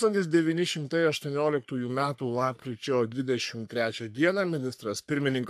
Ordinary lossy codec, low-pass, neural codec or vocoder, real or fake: AAC, 96 kbps; 14.4 kHz; codec, 44.1 kHz, 3.4 kbps, Pupu-Codec; fake